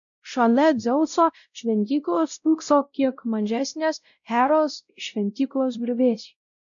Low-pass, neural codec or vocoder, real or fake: 7.2 kHz; codec, 16 kHz, 0.5 kbps, X-Codec, WavLM features, trained on Multilingual LibriSpeech; fake